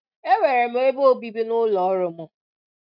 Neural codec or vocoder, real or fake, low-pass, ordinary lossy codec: none; real; 5.4 kHz; none